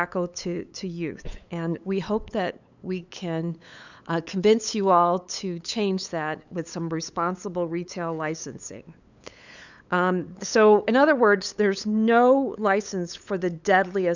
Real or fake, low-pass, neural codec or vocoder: fake; 7.2 kHz; codec, 16 kHz, 8 kbps, FunCodec, trained on LibriTTS, 25 frames a second